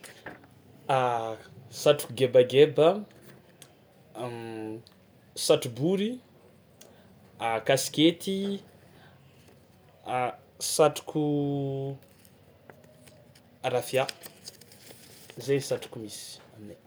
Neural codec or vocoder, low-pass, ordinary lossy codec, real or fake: none; none; none; real